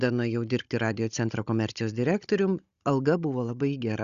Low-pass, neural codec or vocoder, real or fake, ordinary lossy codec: 7.2 kHz; codec, 16 kHz, 16 kbps, FunCodec, trained on Chinese and English, 50 frames a second; fake; Opus, 64 kbps